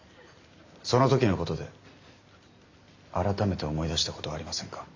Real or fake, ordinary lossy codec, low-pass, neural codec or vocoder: real; AAC, 48 kbps; 7.2 kHz; none